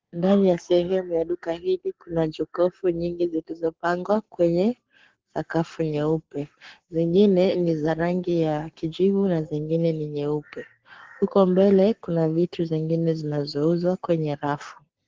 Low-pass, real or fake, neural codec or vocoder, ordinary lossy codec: 7.2 kHz; fake; codec, 44.1 kHz, 7.8 kbps, Pupu-Codec; Opus, 16 kbps